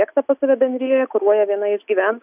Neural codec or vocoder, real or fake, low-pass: none; real; 3.6 kHz